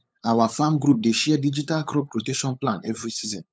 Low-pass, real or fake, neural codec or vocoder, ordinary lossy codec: none; fake; codec, 16 kHz, 8 kbps, FunCodec, trained on LibriTTS, 25 frames a second; none